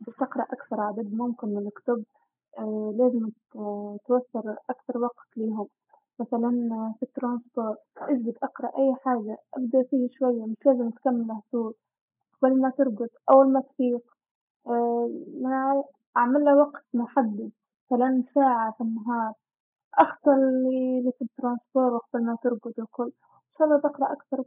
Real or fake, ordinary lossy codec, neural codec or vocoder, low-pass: real; none; none; 3.6 kHz